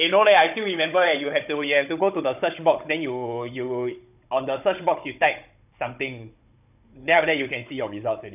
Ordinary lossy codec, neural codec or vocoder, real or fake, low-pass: none; codec, 16 kHz, 16 kbps, FunCodec, trained on Chinese and English, 50 frames a second; fake; 3.6 kHz